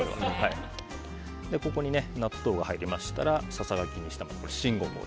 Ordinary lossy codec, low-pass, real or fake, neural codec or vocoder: none; none; real; none